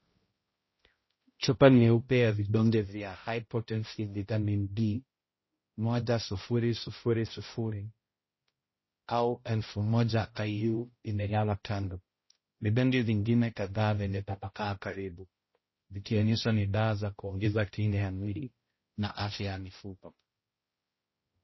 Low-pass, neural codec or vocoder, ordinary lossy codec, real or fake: 7.2 kHz; codec, 16 kHz, 0.5 kbps, X-Codec, HuBERT features, trained on balanced general audio; MP3, 24 kbps; fake